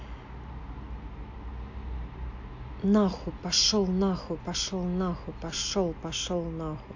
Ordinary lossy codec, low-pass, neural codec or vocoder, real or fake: AAC, 48 kbps; 7.2 kHz; none; real